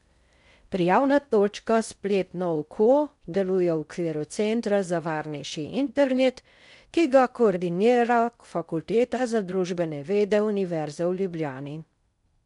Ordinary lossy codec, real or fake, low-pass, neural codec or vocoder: MP3, 96 kbps; fake; 10.8 kHz; codec, 16 kHz in and 24 kHz out, 0.6 kbps, FocalCodec, streaming, 4096 codes